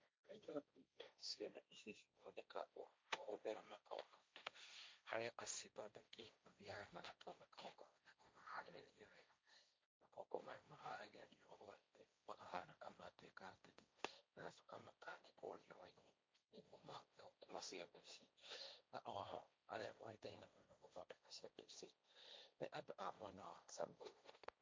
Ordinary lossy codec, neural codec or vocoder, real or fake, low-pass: none; codec, 16 kHz, 1.1 kbps, Voila-Tokenizer; fake; none